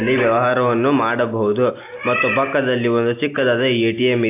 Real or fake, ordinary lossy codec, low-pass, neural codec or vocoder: real; AAC, 24 kbps; 3.6 kHz; none